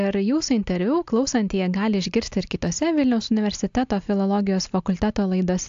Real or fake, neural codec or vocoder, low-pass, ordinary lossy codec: real; none; 7.2 kHz; AAC, 64 kbps